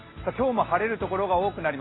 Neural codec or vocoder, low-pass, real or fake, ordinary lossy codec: none; 7.2 kHz; real; AAC, 16 kbps